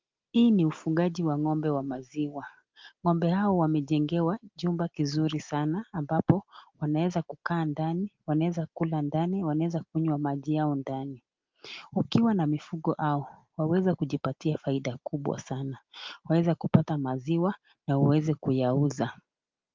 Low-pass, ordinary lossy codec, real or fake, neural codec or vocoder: 7.2 kHz; Opus, 32 kbps; real; none